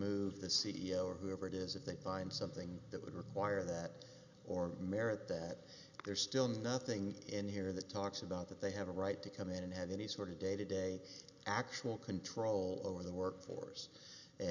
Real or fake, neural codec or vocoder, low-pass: real; none; 7.2 kHz